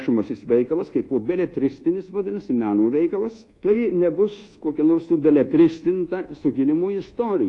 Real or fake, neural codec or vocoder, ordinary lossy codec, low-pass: fake; codec, 24 kHz, 1.2 kbps, DualCodec; AAC, 48 kbps; 10.8 kHz